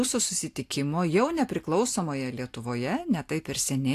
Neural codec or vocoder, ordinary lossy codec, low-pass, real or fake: none; AAC, 64 kbps; 14.4 kHz; real